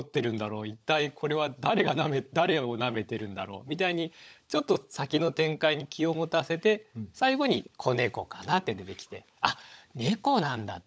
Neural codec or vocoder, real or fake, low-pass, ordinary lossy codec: codec, 16 kHz, 16 kbps, FunCodec, trained on LibriTTS, 50 frames a second; fake; none; none